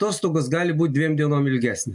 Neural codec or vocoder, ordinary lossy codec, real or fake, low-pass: none; MP3, 64 kbps; real; 10.8 kHz